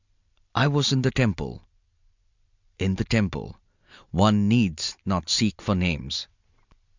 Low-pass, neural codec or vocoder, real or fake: 7.2 kHz; none; real